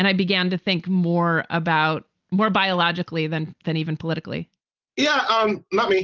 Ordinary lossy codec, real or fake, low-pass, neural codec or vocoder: Opus, 32 kbps; real; 7.2 kHz; none